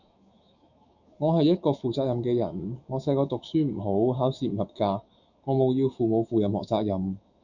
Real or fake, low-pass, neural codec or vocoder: fake; 7.2 kHz; autoencoder, 48 kHz, 128 numbers a frame, DAC-VAE, trained on Japanese speech